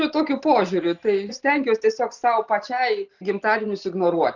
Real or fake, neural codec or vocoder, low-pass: real; none; 7.2 kHz